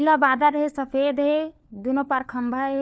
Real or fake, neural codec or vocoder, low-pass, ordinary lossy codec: fake; codec, 16 kHz, 4 kbps, FunCodec, trained on LibriTTS, 50 frames a second; none; none